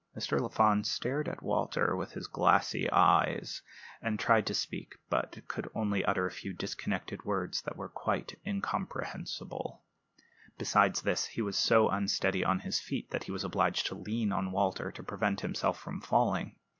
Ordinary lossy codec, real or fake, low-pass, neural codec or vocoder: MP3, 48 kbps; real; 7.2 kHz; none